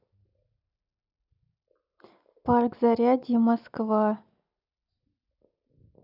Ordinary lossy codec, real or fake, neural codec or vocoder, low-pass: none; real; none; 5.4 kHz